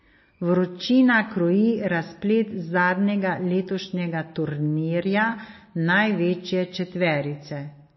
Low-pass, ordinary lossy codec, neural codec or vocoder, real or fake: 7.2 kHz; MP3, 24 kbps; none; real